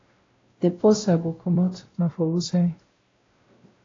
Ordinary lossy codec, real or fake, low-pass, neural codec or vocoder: AAC, 32 kbps; fake; 7.2 kHz; codec, 16 kHz, 0.5 kbps, X-Codec, WavLM features, trained on Multilingual LibriSpeech